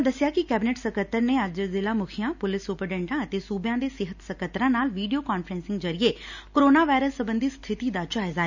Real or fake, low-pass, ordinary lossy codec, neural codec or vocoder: real; 7.2 kHz; none; none